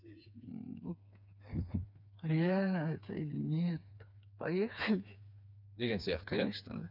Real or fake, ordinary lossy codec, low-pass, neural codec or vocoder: fake; none; 5.4 kHz; codec, 16 kHz, 4 kbps, FreqCodec, smaller model